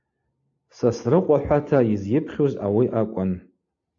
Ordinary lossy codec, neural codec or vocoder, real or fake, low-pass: AAC, 48 kbps; none; real; 7.2 kHz